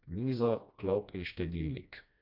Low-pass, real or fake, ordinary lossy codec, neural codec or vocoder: 5.4 kHz; fake; none; codec, 16 kHz, 1 kbps, FreqCodec, smaller model